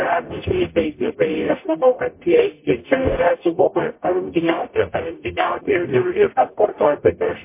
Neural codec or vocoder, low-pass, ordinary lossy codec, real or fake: codec, 44.1 kHz, 0.9 kbps, DAC; 3.6 kHz; MP3, 24 kbps; fake